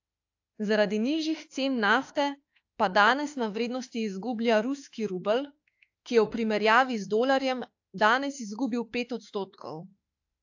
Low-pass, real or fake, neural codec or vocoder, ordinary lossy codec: 7.2 kHz; fake; autoencoder, 48 kHz, 32 numbers a frame, DAC-VAE, trained on Japanese speech; AAC, 48 kbps